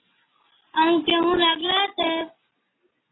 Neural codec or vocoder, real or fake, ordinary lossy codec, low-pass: none; real; AAC, 16 kbps; 7.2 kHz